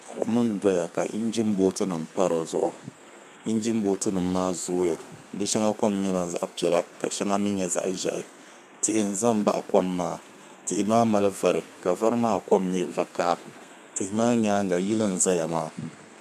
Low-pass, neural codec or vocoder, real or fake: 14.4 kHz; codec, 32 kHz, 1.9 kbps, SNAC; fake